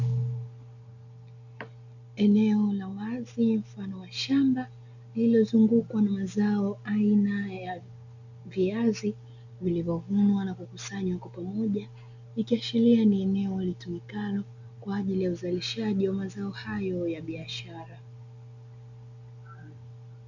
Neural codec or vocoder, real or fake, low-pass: none; real; 7.2 kHz